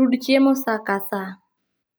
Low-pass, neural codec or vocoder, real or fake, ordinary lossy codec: none; none; real; none